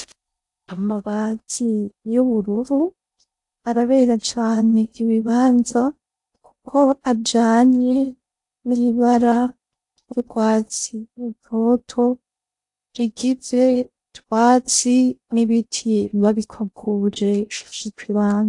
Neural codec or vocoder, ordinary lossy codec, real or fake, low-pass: codec, 16 kHz in and 24 kHz out, 0.6 kbps, FocalCodec, streaming, 4096 codes; AAC, 64 kbps; fake; 10.8 kHz